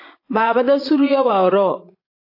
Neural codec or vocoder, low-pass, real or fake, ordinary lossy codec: vocoder, 22.05 kHz, 80 mel bands, Vocos; 5.4 kHz; fake; AAC, 32 kbps